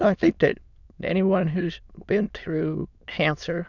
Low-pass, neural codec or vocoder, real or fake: 7.2 kHz; autoencoder, 22.05 kHz, a latent of 192 numbers a frame, VITS, trained on many speakers; fake